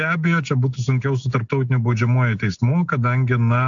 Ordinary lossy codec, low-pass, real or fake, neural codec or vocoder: AAC, 64 kbps; 7.2 kHz; real; none